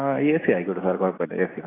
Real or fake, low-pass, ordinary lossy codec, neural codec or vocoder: real; 3.6 kHz; AAC, 16 kbps; none